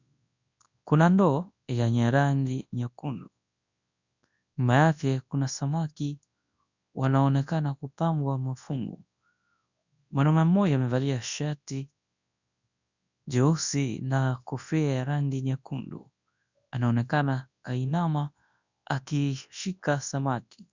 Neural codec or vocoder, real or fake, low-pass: codec, 24 kHz, 0.9 kbps, WavTokenizer, large speech release; fake; 7.2 kHz